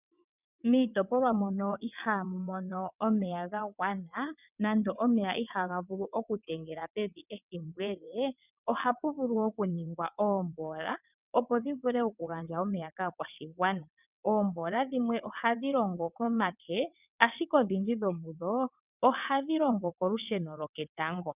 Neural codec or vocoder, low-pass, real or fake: vocoder, 22.05 kHz, 80 mel bands, WaveNeXt; 3.6 kHz; fake